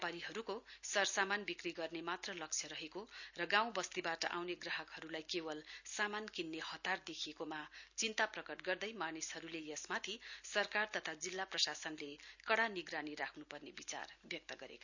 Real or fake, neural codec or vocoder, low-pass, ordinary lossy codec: real; none; 7.2 kHz; none